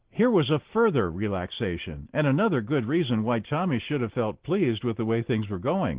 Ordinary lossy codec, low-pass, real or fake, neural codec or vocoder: Opus, 16 kbps; 3.6 kHz; real; none